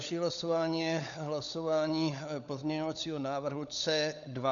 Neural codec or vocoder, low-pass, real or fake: codec, 16 kHz, 16 kbps, FunCodec, trained on LibriTTS, 50 frames a second; 7.2 kHz; fake